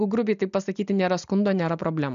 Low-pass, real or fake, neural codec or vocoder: 7.2 kHz; real; none